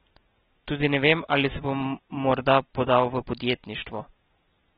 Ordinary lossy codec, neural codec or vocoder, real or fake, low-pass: AAC, 16 kbps; none; real; 7.2 kHz